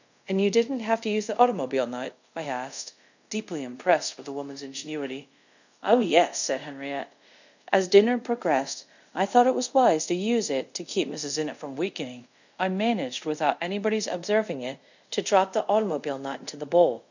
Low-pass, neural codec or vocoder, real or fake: 7.2 kHz; codec, 24 kHz, 0.5 kbps, DualCodec; fake